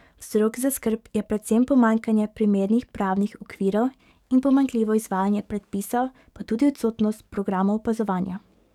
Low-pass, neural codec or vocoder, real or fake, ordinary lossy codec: 19.8 kHz; codec, 44.1 kHz, 7.8 kbps, DAC; fake; none